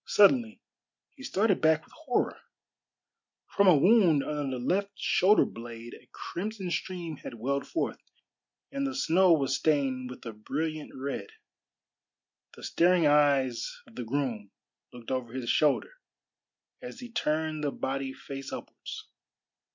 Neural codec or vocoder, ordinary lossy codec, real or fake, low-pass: none; MP3, 48 kbps; real; 7.2 kHz